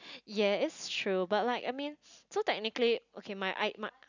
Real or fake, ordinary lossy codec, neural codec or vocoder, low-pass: real; none; none; 7.2 kHz